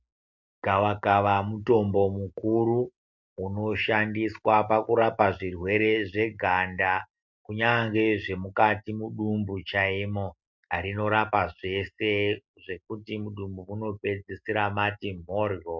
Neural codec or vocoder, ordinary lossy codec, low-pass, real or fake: none; MP3, 64 kbps; 7.2 kHz; real